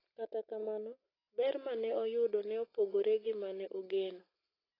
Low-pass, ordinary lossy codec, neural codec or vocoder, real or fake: 5.4 kHz; AAC, 24 kbps; none; real